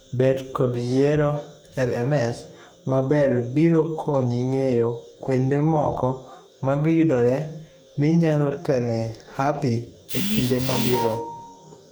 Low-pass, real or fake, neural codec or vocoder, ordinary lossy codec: none; fake; codec, 44.1 kHz, 2.6 kbps, DAC; none